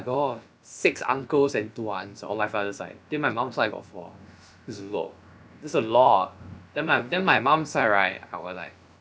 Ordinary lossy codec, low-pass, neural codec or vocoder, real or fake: none; none; codec, 16 kHz, about 1 kbps, DyCAST, with the encoder's durations; fake